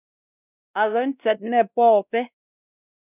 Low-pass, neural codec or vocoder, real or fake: 3.6 kHz; codec, 16 kHz, 1 kbps, X-Codec, WavLM features, trained on Multilingual LibriSpeech; fake